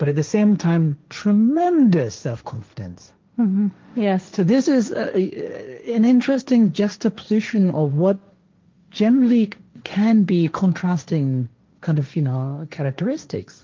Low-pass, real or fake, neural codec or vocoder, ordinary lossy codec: 7.2 kHz; fake; codec, 16 kHz, 1.1 kbps, Voila-Tokenizer; Opus, 24 kbps